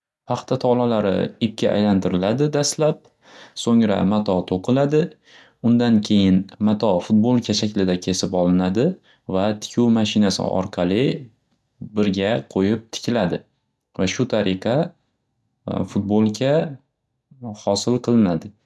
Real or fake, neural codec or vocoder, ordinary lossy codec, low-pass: real; none; none; none